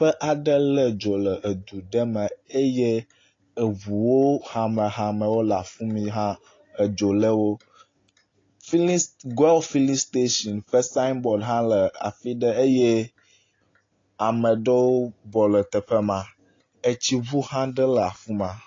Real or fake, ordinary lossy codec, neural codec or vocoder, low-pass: real; AAC, 32 kbps; none; 7.2 kHz